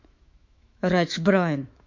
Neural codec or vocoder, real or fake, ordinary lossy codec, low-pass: autoencoder, 48 kHz, 128 numbers a frame, DAC-VAE, trained on Japanese speech; fake; MP3, 48 kbps; 7.2 kHz